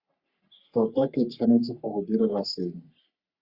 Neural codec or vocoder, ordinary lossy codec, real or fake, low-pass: codec, 44.1 kHz, 3.4 kbps, Pupu-Codec; Opus, 64 kbps; fake; 5.4 kHz